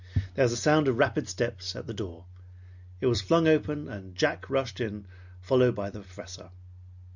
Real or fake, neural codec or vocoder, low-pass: real; none; 7.2 kHz